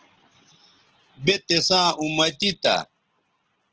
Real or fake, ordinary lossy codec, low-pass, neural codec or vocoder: real; Opus, 16 kbps; 7.2 kHz; none